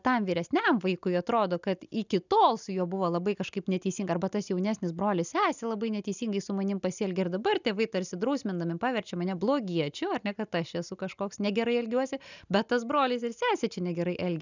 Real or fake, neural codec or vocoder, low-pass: real; none; 7.2 kHz